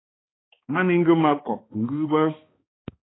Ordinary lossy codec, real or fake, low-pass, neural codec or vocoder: AAC, 16 kbps; fake; 7.2 kHz; codec, 16 kHz, 2 kbps, X-Codec, HuBERT features, trained on balanced general audio